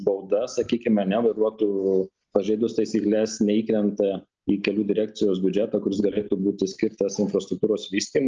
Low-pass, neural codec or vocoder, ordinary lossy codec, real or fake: 7.2 kHz; none; Opus, 24 kbps; real